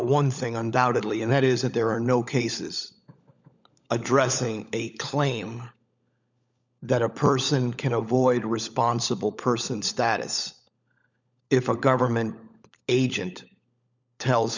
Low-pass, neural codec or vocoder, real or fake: 7.2 kHz; codec, 16 kHz, 16 kbps, FunCodec, trained on LibriTTS, 50 frames a second; fake